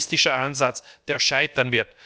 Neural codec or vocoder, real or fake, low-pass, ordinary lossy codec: codec, 16 kHz, about 1 kbps, DyCAST, with the encoder's durations; fake; none; none